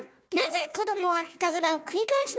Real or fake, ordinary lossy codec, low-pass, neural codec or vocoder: fake; none; none; codec, 16 kHz, 1 kbps, FunCodec, trained on Chinese and English, 50 frames a second